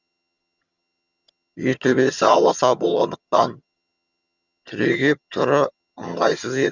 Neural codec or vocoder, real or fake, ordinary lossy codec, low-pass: vocoder, 22.05 kHz, 80 mel bands, HiFi-GAN; fake; none; 7.2 kHz